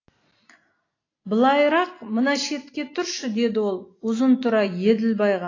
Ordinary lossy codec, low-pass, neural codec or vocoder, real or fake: AAC, 32 kbps; 7.2 kHz; none; real